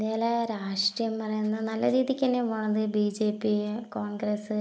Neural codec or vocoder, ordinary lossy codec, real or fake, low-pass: none; none; real; none